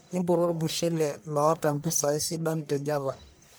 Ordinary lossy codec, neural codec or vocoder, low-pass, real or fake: none; codec, 44.1 kHz, 1.7 kbps, Pupu-Codec; none; fake